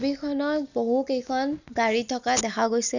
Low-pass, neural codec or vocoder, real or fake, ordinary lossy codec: 7.2 kHz; none; real; none